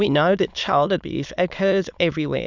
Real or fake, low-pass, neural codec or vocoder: fake; 7.2 kHz; autoencoder, 22.05 kHz, a latent of 192 numbers a frame, VITS, trained on many speakers